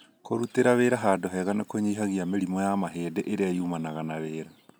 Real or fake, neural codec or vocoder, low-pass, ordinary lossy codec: real; none; none; none